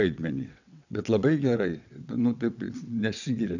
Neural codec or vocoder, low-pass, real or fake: vocoder, 22.05 kHz, 80 mel bands, WaveNeXt; 7.2 kHz; fake